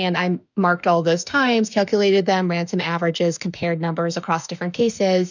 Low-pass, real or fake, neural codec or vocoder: 7.2 kHz; fake; autoencoder, 48 kHz, 32 numbers a frame, DAC-VAE, trained on Japanese speech